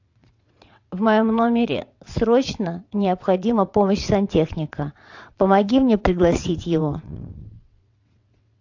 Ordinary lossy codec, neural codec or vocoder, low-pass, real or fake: AAC, 48 kbps; vocoder, 22.05 kHz, 80 mel bands, WaveNeXt; 7.2 kHz; fake